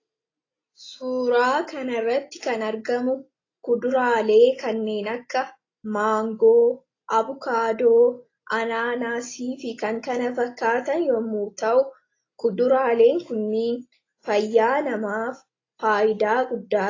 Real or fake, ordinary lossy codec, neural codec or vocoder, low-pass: real; AAC, 32 kbps; none; 7.2 kHz